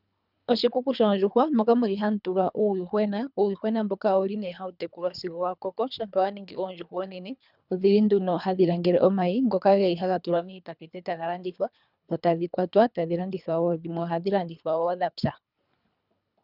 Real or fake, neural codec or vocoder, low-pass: fake; codec, 24 kHz, 3 kbps, HILCodec; 5.4 kHz